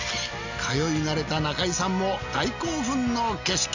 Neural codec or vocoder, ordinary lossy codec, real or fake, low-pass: none; none; real; 7.2 kHz